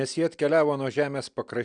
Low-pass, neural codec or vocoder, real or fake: 9.9 kHz; none; real